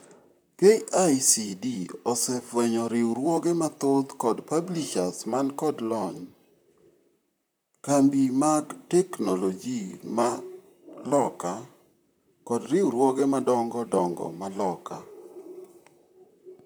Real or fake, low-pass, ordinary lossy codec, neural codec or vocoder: fake; none; none; vocoder, 44.1 kHz, 128 mel bands, Pupu-Vocoder